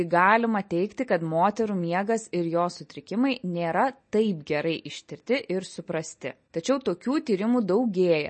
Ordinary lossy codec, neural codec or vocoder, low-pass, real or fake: MP3, 32 kbps; none; 10.8 kHz; real